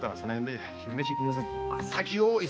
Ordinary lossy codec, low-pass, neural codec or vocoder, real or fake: none; none; codec, 16 kHz, 2 kbps, X-Codec, HuBERT features, trained on balanced general audio; fake